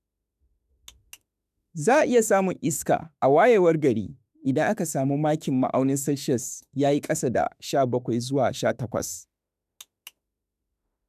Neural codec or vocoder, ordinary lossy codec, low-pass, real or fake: autoencoder, 48 kHz, 32 numbers a frame, DAC-VAE, trained on Japanese speech; none; 14.4 kHz; fake